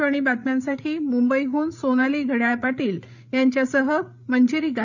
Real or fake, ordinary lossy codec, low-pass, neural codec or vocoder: fake; none; 7.2 kHz; codec, 16 kHz, 8 kbps, FreqCodec, larger model